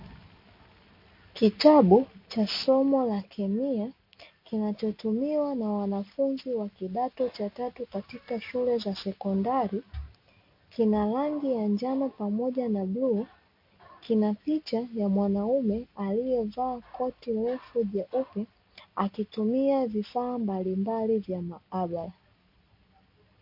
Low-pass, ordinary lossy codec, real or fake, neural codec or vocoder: 5.4 kHz; MP3, 32 kbps; real; none